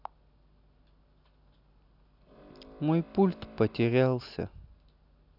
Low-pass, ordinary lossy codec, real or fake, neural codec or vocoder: 5.4 kHz; AAC, 48 kbps; real; none